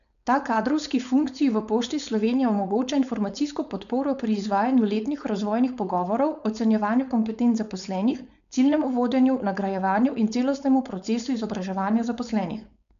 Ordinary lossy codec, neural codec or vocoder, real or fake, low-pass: none; codec, 16 kHz, 4.8 kbps, FACodec; fake; 7.2 kHz